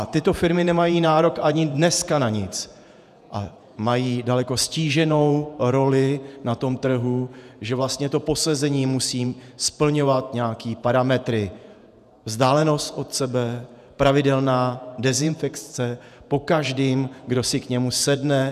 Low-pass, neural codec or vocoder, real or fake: 14.4 kHz; vocoder, 48 kHz, 128 mel bands, Vocos; fake